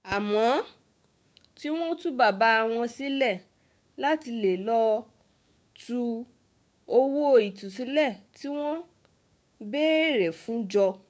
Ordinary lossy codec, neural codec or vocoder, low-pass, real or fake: none; codec, 16 kHz, 6 kbps, DAC; none; fake